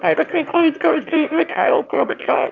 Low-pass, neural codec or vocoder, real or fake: 7.2 kHz; autoencoder, 22.05 kHz, a latent of 192 numbers a frame, VITS, trained on one speaker; fake